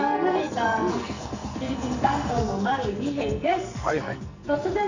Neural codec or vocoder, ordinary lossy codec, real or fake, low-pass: codec, 44.1 kHz, 2.6 kbps, SNAC; none; fake; 7.2 kHz